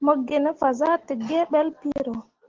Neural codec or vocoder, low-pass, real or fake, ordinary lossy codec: none; 7.2 kHz; real; Opus, 16 kbps